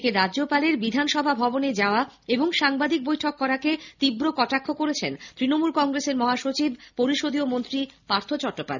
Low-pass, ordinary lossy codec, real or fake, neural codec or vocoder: 7.2 kHz; none; real; none